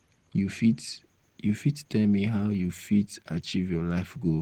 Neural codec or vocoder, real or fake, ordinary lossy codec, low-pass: none; real; Opus, 16 kbps; 19.8 kHz